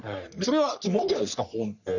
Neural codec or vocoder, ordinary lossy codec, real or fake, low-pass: codec, 44.1 kHz, 3.4 kbps, Pupu-Codec; none; fake; 7.2 kHz